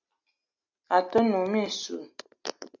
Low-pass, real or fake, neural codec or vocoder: 7.2 kHz; real; none